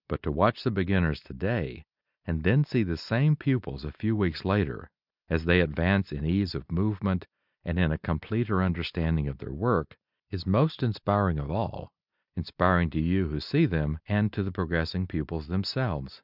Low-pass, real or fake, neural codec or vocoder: 5.4 kHz; real; none